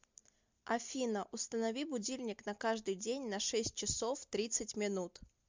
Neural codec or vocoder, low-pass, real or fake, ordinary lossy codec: none; 7.2 kHz; real; MP3, 64 kbps